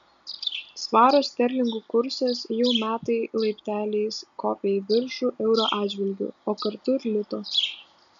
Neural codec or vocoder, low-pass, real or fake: none; 7.2 kHz; real